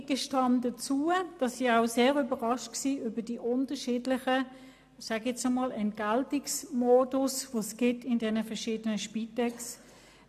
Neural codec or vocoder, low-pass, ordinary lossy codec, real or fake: none; 14.4 kHz; AAC, 96 kbps; real